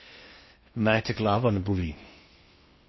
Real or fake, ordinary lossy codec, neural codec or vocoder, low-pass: fake; MP3, 24 kbps; codec, 16 kHz in and 24 kHz out, 0.6 kbps, FocalCodec, streaming, 2048 codes; 7.2 kHz